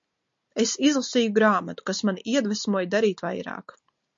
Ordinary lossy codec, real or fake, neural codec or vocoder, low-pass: MP3, 48 kbps; real; none; 7.2 kHz